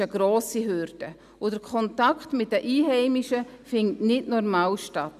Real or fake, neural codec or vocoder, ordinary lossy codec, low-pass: real; none; none; 14.4 kHz